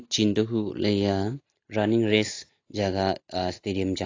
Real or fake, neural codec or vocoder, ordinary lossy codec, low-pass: real; none; AAC, 32 kbps; 7.2 kHz